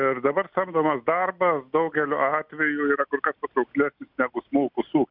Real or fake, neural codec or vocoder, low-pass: real; none; 5.4 kHz